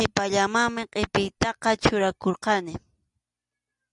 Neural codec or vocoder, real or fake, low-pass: none; real; 10.8 kHz